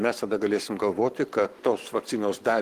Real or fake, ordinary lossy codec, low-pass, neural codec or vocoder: fake; Opus, 24 kbps; 14.4 kHz; codec, 44.1 kHz, 7.8 kbps, Pupu-Codec